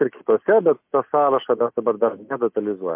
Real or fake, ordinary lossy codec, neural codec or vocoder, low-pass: real; MP3, 32 kbps; none; 3.6 kHz